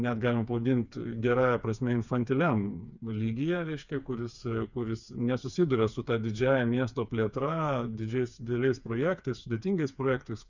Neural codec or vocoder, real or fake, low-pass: codec, 16 kHz, 4 kbps, FreqCodec, smaller model; fake; 7.2 kHz